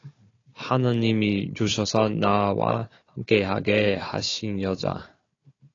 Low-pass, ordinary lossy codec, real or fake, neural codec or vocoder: 7.2 kHz; AAC, 32 kbps; fake; codec, 16 kHz, 4 kbps, FunCodec, trained on Chinese and English, 50 frames a second